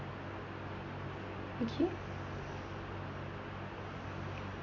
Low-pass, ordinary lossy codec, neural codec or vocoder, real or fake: 7.2 kHz; MP3, 32 kbps; none; real